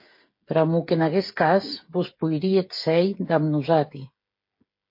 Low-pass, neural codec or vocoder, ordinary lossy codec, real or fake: 5.4 kHz; codec, 16 kHz, 8 kbps, FreqCodec, smaller model; MP3, 32 kbps; fake